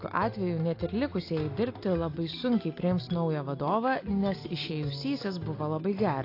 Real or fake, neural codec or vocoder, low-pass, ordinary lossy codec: real; none; 5.4 kHz; AAC, 32 kbps